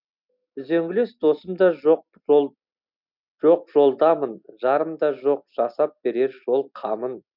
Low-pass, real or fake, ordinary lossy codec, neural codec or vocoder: 5.4 kHz; real; none; none